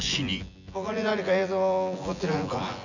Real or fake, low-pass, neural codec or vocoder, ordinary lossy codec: fake; 7.2 kHz; vocoder, 24 kHz, 100 mel bands, Vocos; none